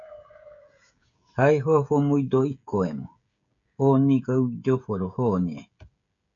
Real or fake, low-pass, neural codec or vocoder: fake; 7.2 kHz; codec, 16 kHz, 16 kbps, FreqCodec, smaller model